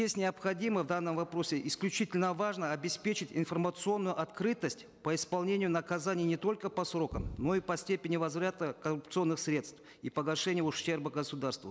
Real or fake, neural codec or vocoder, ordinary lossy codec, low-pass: real; none; none; none